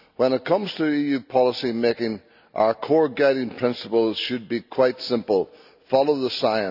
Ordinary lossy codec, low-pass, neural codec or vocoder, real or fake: none; 5.4 kHz; none; real